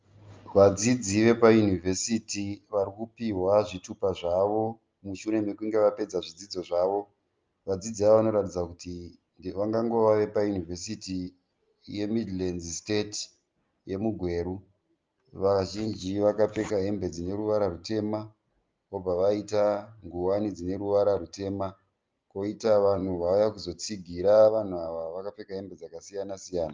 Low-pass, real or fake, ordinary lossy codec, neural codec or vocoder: 7.2 kHz; real; Opus, 24 kbps; none